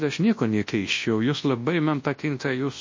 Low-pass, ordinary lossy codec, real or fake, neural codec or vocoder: 7.2 kHz; MP3, 32 kbps; fake; codec, 24 kHz, 0.9 kbps, WavTokenizer, large speech release